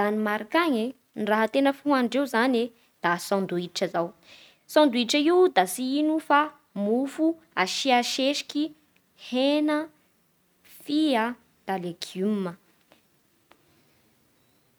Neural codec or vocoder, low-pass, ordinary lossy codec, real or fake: none; none; none; real